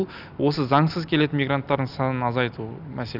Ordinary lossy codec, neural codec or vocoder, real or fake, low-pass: none; none; real; 5.4 kHz